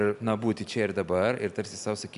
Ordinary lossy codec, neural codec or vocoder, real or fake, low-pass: MP3, 96 kbps; none; real; 10.8 kHz